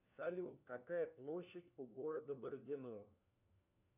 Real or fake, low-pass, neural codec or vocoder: fake; 3.6 kHz; codec, 16 kHz, 1 kbps, FunCodec, trained on LibriTTS, 50 frames a second